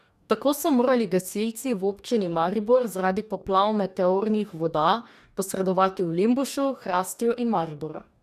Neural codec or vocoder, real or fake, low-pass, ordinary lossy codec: codec, 44.1 kHz, 2.6 kbps, DAC; fake; 14.4 kHz; none